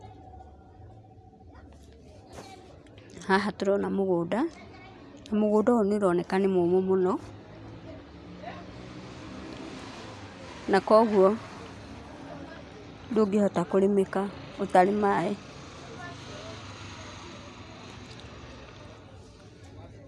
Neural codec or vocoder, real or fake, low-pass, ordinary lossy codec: none; real; none; none